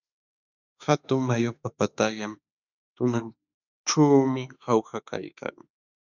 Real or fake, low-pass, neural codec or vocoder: fake; 7.2 kHz; autoencoder, 48 kHz, 32 numbers a frame, DAC-VAE, trained on Japanese speech